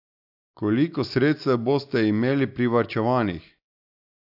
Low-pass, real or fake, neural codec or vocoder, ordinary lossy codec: 5.4 kHz; real; none; AAC, 48 kbps